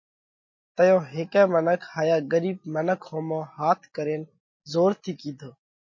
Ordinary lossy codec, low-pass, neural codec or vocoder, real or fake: MP3, 32 kbps; 7.2 kHz; none; real